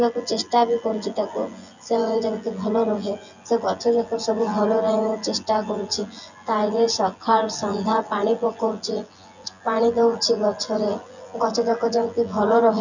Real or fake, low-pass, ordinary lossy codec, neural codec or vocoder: fake; 7.2 kHz; none; vocoder, 24 kHz, 100 mel bands, Vocos